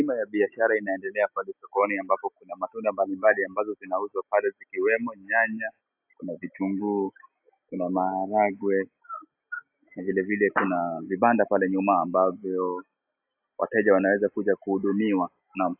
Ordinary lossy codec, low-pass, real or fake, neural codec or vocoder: AAC, 32 kbps; 3.6 kHz; real; none